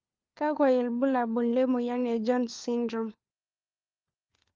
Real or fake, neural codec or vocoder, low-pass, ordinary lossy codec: fake; codec, 16 kHz, 4 kbps, FunCodec, trained on LibriTTS, 50 frames a second; 7.2 kHz; Opus, 24 kbps